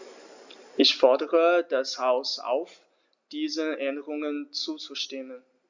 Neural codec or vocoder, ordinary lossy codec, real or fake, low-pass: none; Opus, 64 kbps; real; 7.2 kHz